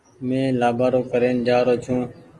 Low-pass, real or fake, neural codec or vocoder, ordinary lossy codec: 10.8 kHz; real; none; Opus, 24 kbps